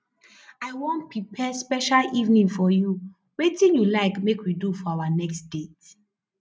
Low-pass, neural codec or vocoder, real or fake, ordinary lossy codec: none; none; real; none